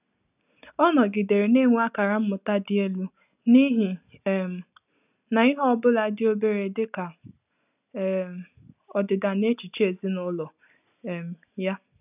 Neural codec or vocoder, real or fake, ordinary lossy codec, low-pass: none; real; none; 3.6 kHz